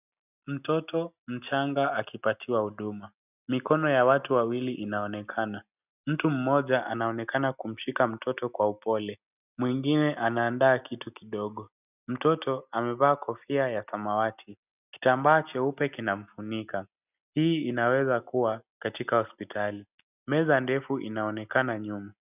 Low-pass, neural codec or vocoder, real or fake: 3.6 kHz; none; real